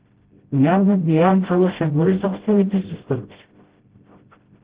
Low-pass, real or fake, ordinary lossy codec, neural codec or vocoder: 3.6 kHz; fake; Opus, 16 kbps; codec, 16 kHz, 0.5 kbps, FreqCodec, smaller model